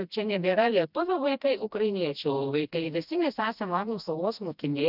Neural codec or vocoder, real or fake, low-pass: codec, 16 kHz, 1 kbps, FreqCodec, smaller model; fake; 5.4 kHz